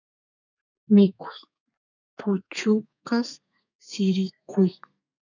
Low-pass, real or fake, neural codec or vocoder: 7.2 kHz; fake; codec, 32 kHz, 1.9 kbps, SNAC